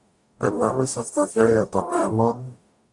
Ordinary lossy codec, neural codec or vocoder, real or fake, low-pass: AAC, 64 kbps; codec, 44.1 kHz, 0.9 kbps, DAC; fake; 10.8 kHz